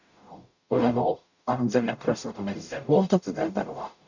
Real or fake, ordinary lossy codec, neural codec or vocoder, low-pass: fake; none; codec, 44.1 kHz, 0.9 kbps, DAC; 7.2 kHz